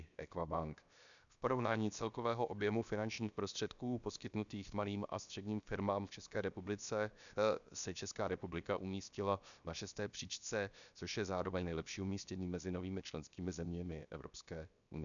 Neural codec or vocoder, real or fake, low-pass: codec, 16 kHz, 0.7 kbps, FocalCodec; fake; 7.2 kHz